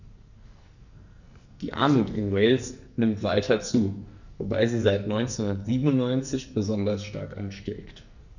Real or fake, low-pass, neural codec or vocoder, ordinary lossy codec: fake; 7.2 kHz; codec, 44.1 kHz, 2.6 kbps, SNAC; none